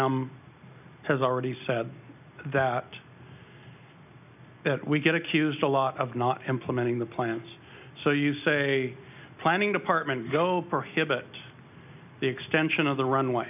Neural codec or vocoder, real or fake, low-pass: none; real; 3.6 kHz